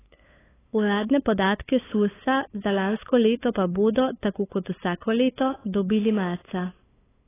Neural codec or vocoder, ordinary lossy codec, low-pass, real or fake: codec, 16 kHz, 8 kbps, FunCodec, trained on LibriTTS, 25 frames a second; AAC, 16 kbps; 3.6 kHz; fake